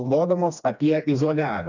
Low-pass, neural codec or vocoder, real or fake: 7.2 kHz; codec, 16 kHz, 2 kbps, FreqCodec, smaller model; fake